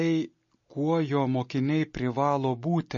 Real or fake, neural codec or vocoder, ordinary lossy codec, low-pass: real; none; MP3, 32 kbps; 7.2 kHz